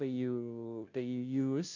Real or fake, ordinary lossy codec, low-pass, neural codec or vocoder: fake; none; 7.2 kHz; codec, 16 kHz, 0.5 kbps, FunCodec, trained on Chinese and English, 25 frames a second